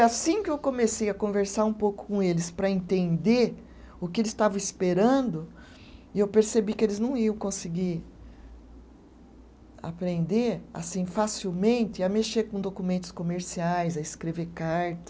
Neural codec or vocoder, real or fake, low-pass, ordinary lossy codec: none; real; none; none